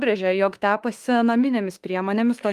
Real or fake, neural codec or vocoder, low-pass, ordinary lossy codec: fake; autoencoder, 48 kHz, 32 numbers a frame, DAC-VAE, trained on Japanese speech; 14.4 kHz; Opus, 32 kbps